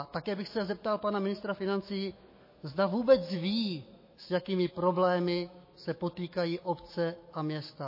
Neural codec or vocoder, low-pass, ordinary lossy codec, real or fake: autoencoder, 48 kHz, 128 numbers a frame, DAC-VAE, trained on Japanese speech; 5.4 kHz; MP3, 24 kbps; fake